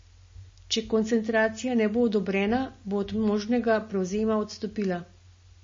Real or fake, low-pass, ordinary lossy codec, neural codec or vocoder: real; 7.2 kHz; MP3, 32 kbps; none